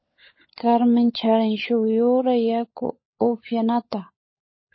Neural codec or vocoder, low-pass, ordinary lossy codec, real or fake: codec, 16 kHz, 16 kbps, FunCodec, trained on LibriTTS, 50 frames a second; 7.2 kHz; MP3, 24 kbps; fake